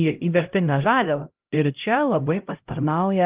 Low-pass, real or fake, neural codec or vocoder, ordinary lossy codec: 3.6 kHz; fake; codec, 16 kHz, 0.5 kbps, X-Codec, HuBERT features, trained on LibriSpeech; Opus, 32 kbps